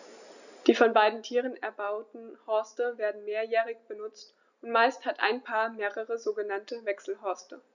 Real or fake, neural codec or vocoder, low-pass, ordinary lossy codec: real; none; 7.2 kHz; none